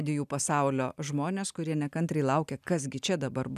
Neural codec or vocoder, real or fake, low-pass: none; real; 14.4 kHz